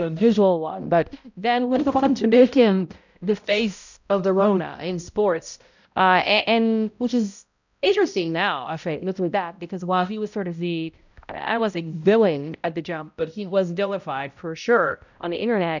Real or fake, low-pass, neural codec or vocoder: fake; 7.2 kHz; codec, 16 kHz, 0.5 kbps, X-Codec, HuBERT features, trained on balanced general audio